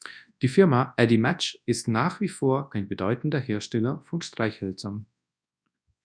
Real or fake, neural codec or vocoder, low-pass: fake; codec, 24 kHz, 0.9 kbps, WavTokenizer, large speech release; 9.9 kHz